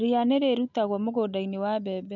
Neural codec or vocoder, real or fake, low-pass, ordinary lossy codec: none; real; 7.2 kHz; none